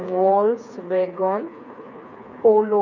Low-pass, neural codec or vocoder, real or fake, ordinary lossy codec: 7.2 kHz; codec, 16 kHz, 4 kbps, FreqCodec, smaller model; fake; none